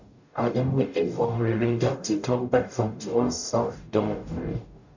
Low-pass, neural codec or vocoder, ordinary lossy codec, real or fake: 7.2 kHz; codec, 44.1 kHz, 0.9 kbps, DAC; none; fake